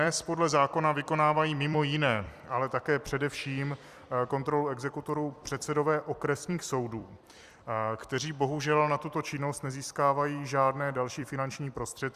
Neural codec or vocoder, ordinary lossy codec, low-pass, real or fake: vocoder, 44.1 kHz, 128 mel bands every 256 samples, BigVGAN v2; Opus, 64 kbps; 14.4 kHz; fake